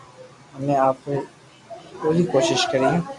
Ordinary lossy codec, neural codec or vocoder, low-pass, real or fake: AAC, 64 kbps; none; 10.8 kHz; real